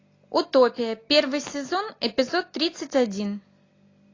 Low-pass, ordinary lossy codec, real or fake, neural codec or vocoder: 7.2 kHz; AAC, 32 kbps; real; none